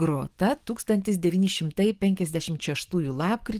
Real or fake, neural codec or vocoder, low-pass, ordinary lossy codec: fake; codec, 44.1 kHz, 7.8 kbps, DAC; 14.4 kHz; Opus, 24 kbps